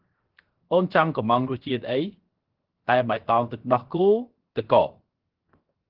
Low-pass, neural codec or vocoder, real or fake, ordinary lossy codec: 5.4 kHz; codec, 16 kHz, 0.7 kbps, FocalCodec; fake; Opus, 16 kbps